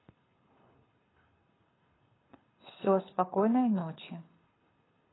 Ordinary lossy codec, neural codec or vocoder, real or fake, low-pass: AAC, 16 kbps; codec, 24 kHz, 6 kbps, HILCodec; fake; 7.2 kHz